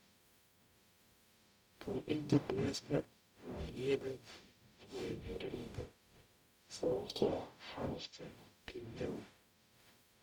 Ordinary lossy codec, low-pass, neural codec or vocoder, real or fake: none; 19.8 kHz; codec, 44.1 kHz, 0.9 kbps, DAC; fake